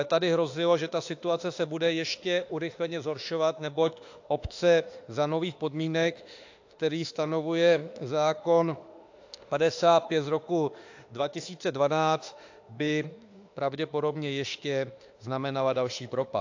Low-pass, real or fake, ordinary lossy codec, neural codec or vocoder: 7.2 kHz; fake; AAC, 48 kbps; autoencoder, 48 kHz, 32 numbers a frame, DAC-VAE, trained on Japanese speech